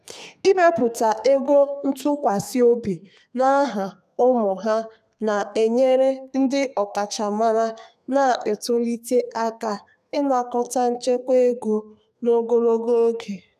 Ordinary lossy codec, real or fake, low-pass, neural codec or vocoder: none; fake; 14.4 kHz; codec, 32 kHz, 1.9 kbps, SNAC